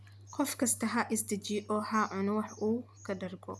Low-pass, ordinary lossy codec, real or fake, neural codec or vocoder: none; none; real; none